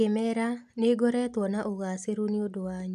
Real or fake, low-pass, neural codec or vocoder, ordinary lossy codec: real; 14.4 kHz; none; none